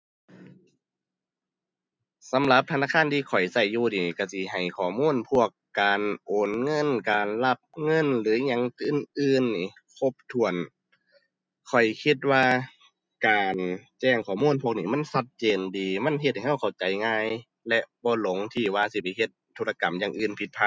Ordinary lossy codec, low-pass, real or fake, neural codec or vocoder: none; none; real; none